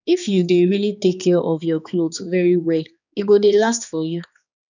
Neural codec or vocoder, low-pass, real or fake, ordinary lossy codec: codec, 16 kHz, 2 kbps, X-Codec, HuBERT features, trained on balanced general audio; 7.2 kHz; fake; none